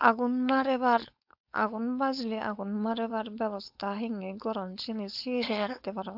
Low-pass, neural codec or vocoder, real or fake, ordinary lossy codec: 5.4 kHz; codec, 16 kHz, 4.8 kbps, FACodec; fake; AAC, 48 kbps